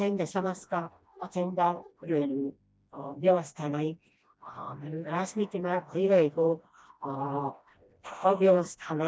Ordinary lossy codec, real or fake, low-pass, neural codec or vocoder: none; fake; none; codec, 16 kHz, 1 kbps, FreqCodec, smaller model